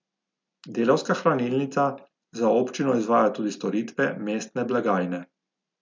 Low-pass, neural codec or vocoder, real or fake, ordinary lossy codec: 7.2 kHz; none; real; MP3, 64 kbps